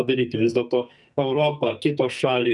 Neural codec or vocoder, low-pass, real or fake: codec, 44.1 kHz, 2.6 kbps, SNAC; 10.8 kHz; fake